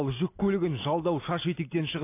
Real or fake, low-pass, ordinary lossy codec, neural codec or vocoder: real; 3.6 kHz; AAC, 24 kbps; none